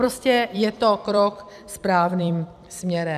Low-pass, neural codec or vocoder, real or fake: 14.4 kHz; vocoder, 44.1 kHz, 128 mel bands every 256 samples, BigVGAN v2; fake